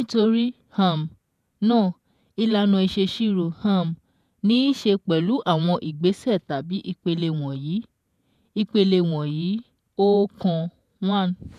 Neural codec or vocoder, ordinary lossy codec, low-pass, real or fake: vocoder, 48 kHz, 128 mel bands, Vocos; none; 14.4 kHz; fake